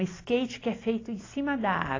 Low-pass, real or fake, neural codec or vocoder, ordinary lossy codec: 7.2 kHz; real; none; AAC, 32 kbps